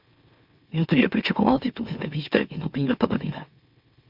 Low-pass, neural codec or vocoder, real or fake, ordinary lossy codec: 5.4 kHz; autoencoder, 44.1 kHz, a latent of 192 numbers a frame, MeloTTS; fake; Opus, 64 kbps